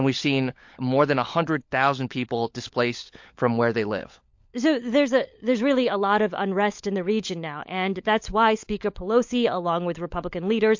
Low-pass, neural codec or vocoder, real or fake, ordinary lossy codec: 7.2 kHz; codec, 16 kHz, 16 kbps, FunCodec, trained on LibriTTS, 50 frames a second; fake; MP3, 48 kbps